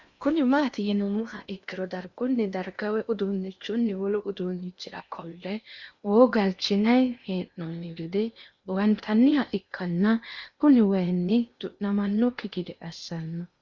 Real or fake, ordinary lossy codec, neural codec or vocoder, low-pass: fake; Opus, 64 kbps; codec, 16 kHz in and 24 kHz out, 0.8 kbps, FocalCodec, streaming, 65536 codes; 7.2 kHz